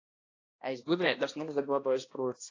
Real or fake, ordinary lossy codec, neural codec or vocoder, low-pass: fake; AAC, 32 kbps; codec, 16 kHz, 1 kbps, X-Codec, HuBERT features, trained on balanced general audio; 7.2 kHz